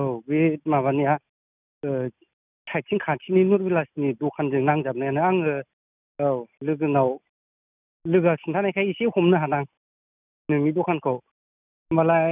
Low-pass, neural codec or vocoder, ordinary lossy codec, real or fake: 3.6 kHz; none; none; real